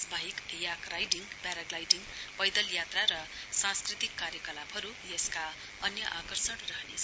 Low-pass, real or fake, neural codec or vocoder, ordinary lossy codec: none; real; none; none